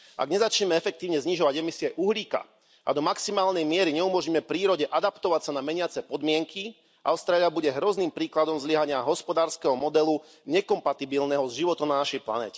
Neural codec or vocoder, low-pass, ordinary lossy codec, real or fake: none; none; none; real